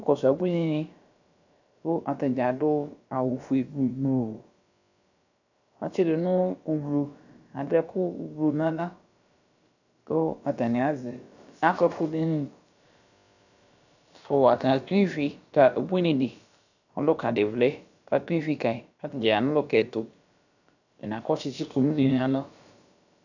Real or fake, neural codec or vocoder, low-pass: fake; codec, 16 kHz, about 1 kbps, DyCAST, with the encoder's durations; 7.2 kHz